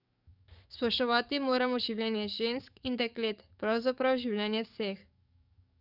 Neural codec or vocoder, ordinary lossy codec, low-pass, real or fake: codec, 44.1 kHz, 7.8 kbps, DAC; none; 5.4 kHz; fake